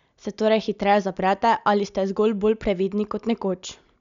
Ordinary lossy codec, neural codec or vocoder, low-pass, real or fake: MP3, 96 kbps; none; 7.2 kHz; real